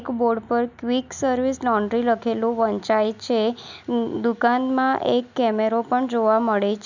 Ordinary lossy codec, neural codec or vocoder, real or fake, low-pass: none; none; real; 7.2 kHz